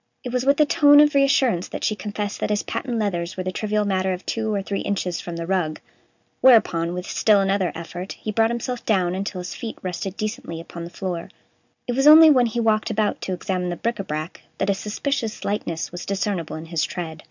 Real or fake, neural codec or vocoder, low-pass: real; none; 7.2 kHz